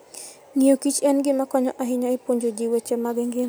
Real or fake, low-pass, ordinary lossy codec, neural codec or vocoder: real; none; none; none